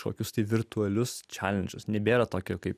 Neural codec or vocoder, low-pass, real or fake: none; 14.4 kHz; real